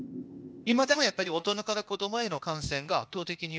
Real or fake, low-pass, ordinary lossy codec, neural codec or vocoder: fake; none; none; codec, 16 kHz, 0.8 kbps, ZipCodec